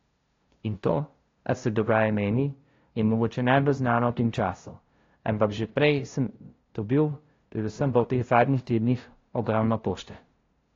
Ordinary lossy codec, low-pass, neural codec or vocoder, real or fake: AAC, 32 kbps; 7.2 kHz; codec, 16 kHz, 0.5 kbps, FunCodec, trained on LibriTTS, 25 frames a second; fake